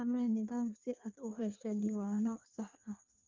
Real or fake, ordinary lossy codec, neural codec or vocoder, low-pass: fake; Opus, 32 kbps; codec, 16 kHz in and 24 kHz out, 1.1 kbps, FireRedTTS-2 codec; 7.2 kHz